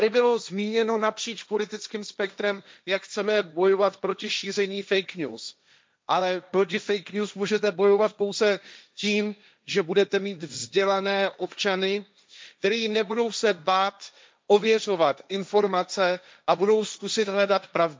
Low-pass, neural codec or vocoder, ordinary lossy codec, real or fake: none; codec, 16 kHz, 1.1 kbps, Voila-Tokenizer; none; fake